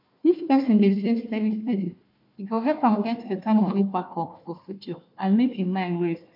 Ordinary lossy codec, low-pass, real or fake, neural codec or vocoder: MP3, 48 kbps; 5.4 kHz; fake; codec, 16 kHz, 1 kbps, FunCodec, trained on Chinese and English, 50 frames a second